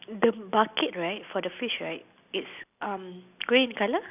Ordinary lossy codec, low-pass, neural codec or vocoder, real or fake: none; 3.6 kHz; none; real